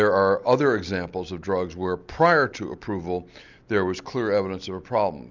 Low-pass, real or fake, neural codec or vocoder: 7.2 kHz; real; none